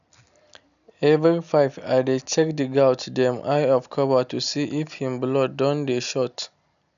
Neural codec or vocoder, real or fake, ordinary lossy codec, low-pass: none; real; none; 7.2 kHz